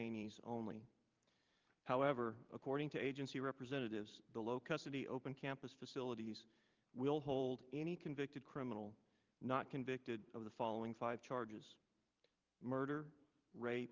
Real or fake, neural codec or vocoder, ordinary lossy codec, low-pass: fake; vocoder, 44.1 kHz, 128 mel bands every 512 samples, BigVGAN v2; Opus, 24 kbps; 7.2 kHz